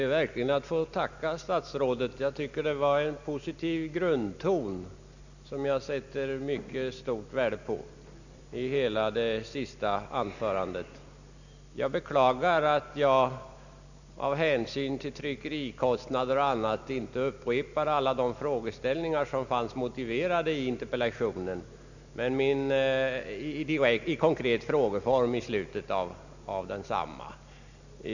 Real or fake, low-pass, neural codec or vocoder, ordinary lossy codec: real; 7.2 kHz; none; none